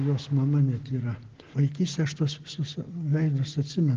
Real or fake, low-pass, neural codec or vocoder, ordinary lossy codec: real; 7.2 kHz; none; Opus, 16 kbps